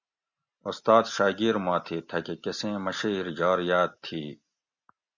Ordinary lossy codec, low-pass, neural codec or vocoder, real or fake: Opus, 64 kbps; 7.2 kHz; none; real